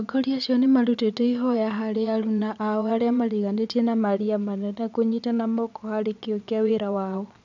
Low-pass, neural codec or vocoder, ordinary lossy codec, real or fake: 7.2 kHz; vocoder, 22.05 kHz, 80 mel bands, Vocos; none; fake